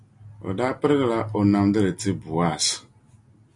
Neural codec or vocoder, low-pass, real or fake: none; 10.8 kHz; real